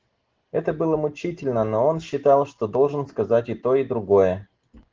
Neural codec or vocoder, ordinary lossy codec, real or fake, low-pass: none; Opus, 16 kbps; real; 7.2 kHz